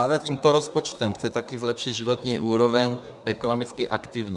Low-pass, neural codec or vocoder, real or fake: 10.8 kHz; codec, 24 kHz, 1 kbps, SNAC; fake